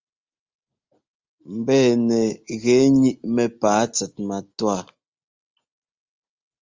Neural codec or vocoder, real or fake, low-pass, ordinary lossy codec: none; real; 7.2 kHz; Opus, 32 kbps